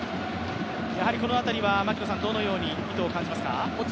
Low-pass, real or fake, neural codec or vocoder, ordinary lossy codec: none; real; none; none